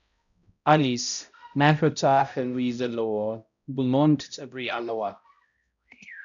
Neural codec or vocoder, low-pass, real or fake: codec, 16 kHz, 0.5 kbps, X-Codec, HuBERT features, trained on balanced general audio; 7.2 kHz; fake